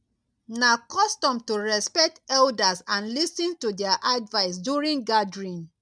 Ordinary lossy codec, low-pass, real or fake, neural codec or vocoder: none; 9.9 kHz; real; none